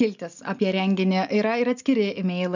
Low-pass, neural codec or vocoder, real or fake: 7.2 kHz; none; real